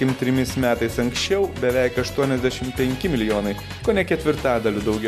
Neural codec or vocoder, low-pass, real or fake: none; 14.4 kHz; real